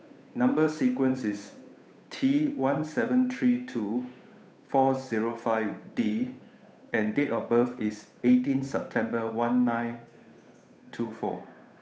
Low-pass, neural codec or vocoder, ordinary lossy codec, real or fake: none; codec, 16 kHz, 8 kbps, FunCodec, trained on Chinese and English, 25 frames a second; none; fake